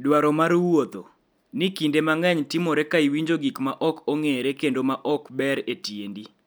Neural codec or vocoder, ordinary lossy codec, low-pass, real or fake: none; none; none; real